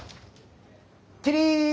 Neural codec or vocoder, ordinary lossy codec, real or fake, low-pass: none; none; real; none